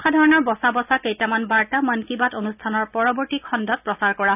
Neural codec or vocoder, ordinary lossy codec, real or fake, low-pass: none; none; real; 3.6 kHz